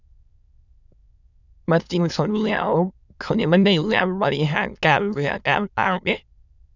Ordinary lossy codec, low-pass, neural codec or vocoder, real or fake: none; 7.2 kHz; autoencoder, 22.05 kHz, a latent of 192 numbers a frame, VITS, trained on many speakers; fake